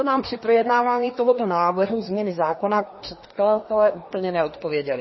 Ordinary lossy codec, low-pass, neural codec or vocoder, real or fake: MP3, 24 kbps; 7.2 kHz; codec, 24 kHz, 1 kbps, SNAC; fake